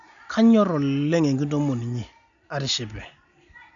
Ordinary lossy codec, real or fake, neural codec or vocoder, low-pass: none; real; none; 7.2 kHz